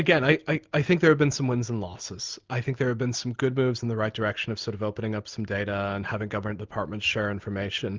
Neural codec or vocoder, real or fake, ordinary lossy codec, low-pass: none; real; Opus, 24 kbps; 7.2 kHz